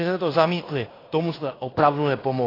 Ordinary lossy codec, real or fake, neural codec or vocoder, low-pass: AAC, 24 kbps; fake; codec, 16 kHz in and 24 kHz out, 0.9 kbps, LongCat-Audio-Codec, fine tuned four codebook decoder; 5.4 kHz